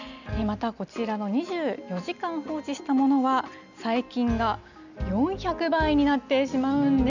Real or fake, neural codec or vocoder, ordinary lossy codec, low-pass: real; none; none; 7.2 kHz